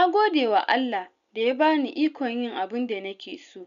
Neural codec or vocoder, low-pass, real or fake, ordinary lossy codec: none; 7.2 kHz; real; none